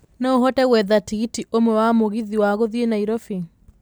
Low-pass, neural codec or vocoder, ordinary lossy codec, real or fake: none; none; none; real